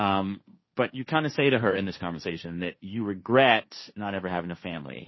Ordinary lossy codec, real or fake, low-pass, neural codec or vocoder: MP3, 24 kbps; fake; 7.2 kHz; codec, 16 kHz, 1.1 kbps, Voila-Tokenizer